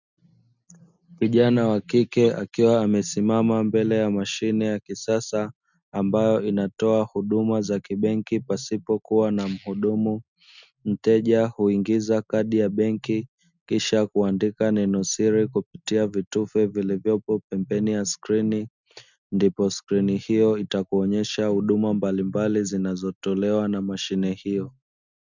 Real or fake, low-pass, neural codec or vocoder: real; 7.2 kHz; none